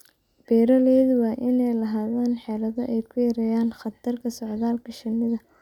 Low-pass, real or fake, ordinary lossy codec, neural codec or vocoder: 19.8 kHz; real; none; none